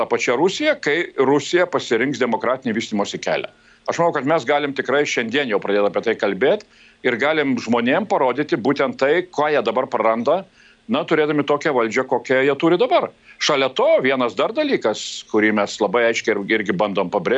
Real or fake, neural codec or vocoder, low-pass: real; none; 9.9 kHz